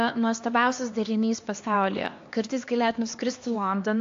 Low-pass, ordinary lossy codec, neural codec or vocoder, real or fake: 7.2 kHz; AAC, 48 kbps; codec, 16 kHz, 2 kbps, X-Codec, HuBERT features, trained on LibriSpeech; fake